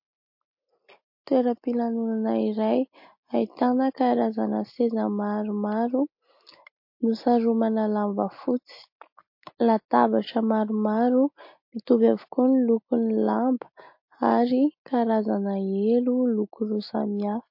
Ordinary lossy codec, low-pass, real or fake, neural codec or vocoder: MP3, 32 kbps; 5.4 kHz; real; none